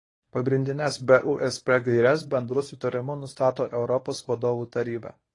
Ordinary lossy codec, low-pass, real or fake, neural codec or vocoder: AAC, 32 kbps; 10.8 kHz; fake; codec, 24 kHz, 0.9 kbps, WavTokenizer, medium speech release version 1